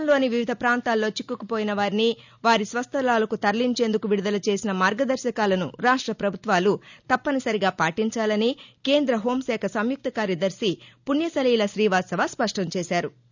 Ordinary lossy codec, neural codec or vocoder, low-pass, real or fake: none; none; 7.2 kHz; real